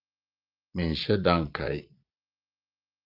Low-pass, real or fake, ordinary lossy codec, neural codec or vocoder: 5.4 kHz; fake; Opus, 24 kbps; vocoder, 22.05 kHz, 80 mel bands, Vocos